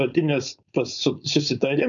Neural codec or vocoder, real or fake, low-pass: codec, 16 kHz, 4.8 kbps, FACodec; fake; 7.2 kHz